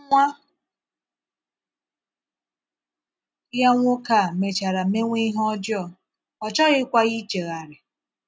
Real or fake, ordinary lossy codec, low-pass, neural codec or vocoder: real; none; none; none